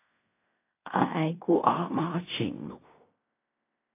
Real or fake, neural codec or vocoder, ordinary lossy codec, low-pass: fake; codec, 16 kHz in and 24 kHz out, 0.4 kbps, LongCat-Audio-Codec, fine tuned four codebook decoder; AAC, 24 kbps; 3.6 kHz